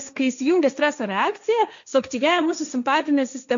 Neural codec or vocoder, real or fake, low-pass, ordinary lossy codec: codec, 16 kHz, 1.1 kbps, Voila-Tokenizer; fake; 7.2 kHz; MP3, 64 kbps